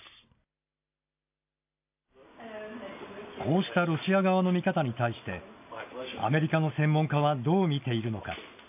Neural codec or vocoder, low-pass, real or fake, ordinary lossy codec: none; 3.6 kHz; real; AAC, 32 kbps